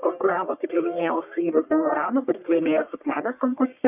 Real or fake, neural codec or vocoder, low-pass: fake; codec, 44.1 kHz, 1.7 kbps, Pupu-Codec; 3.6 kHz